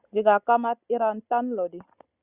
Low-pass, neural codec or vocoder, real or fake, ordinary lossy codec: 3.6 kHz; none; real; Opus, 64 kbps